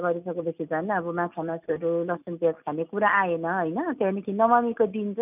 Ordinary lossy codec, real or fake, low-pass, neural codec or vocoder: none; real; 3.6 kHz; none